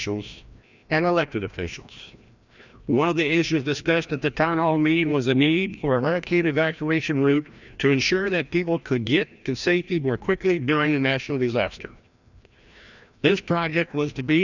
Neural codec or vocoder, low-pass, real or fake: codec, 16 kHz, 1 kbps, FreqCodec, larger model; 7.2 kHz; fake